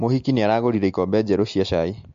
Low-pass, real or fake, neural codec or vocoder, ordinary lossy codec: 7.2 kHz; real; none; MP3, 48 kbps